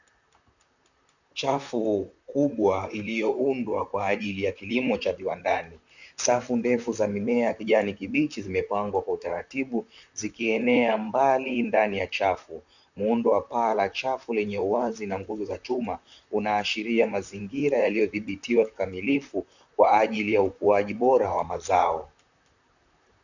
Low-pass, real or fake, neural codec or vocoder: 7.2 kHz; fake; vocoder, 44.1 kHz, 128 mel bands, Pupu-Vocoder